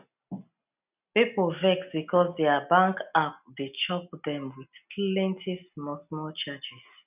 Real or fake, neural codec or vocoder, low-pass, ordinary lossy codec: real; none; 3.6 kHz; none